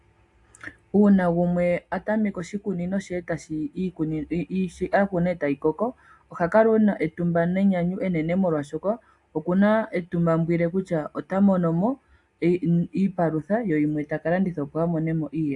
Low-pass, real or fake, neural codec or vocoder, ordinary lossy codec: 10.8 kHz; real; none; AAC, 64 kbps